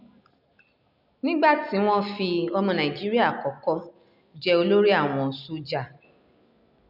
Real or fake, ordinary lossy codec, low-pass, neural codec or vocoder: real; none; 5.4 kHz; none